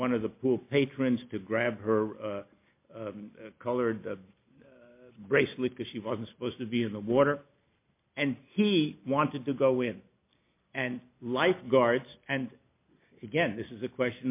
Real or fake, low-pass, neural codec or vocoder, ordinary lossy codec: real; 3.6 kHz; none; MP3, 24 kbps